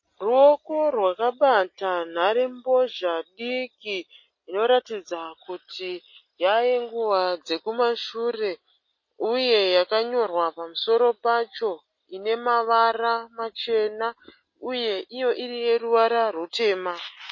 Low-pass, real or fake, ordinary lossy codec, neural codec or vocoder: 7.2 kHz; real; MP3, 32 kbps; none